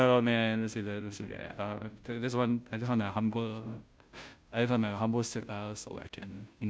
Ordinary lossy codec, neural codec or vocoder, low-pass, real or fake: none; codec, 16 kHz, 0.5 kbps, FunCodec, trained on Chinese and English, 25 frames a second; none; fake